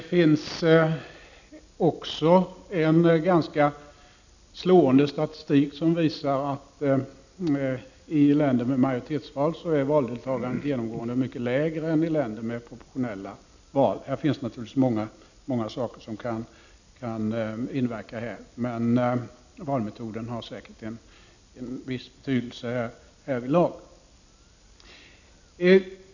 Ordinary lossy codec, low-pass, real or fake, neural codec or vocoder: none; 7.2 kHz; real; none